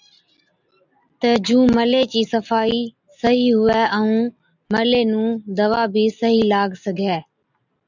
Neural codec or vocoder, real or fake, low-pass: none; real; 7.2 kHz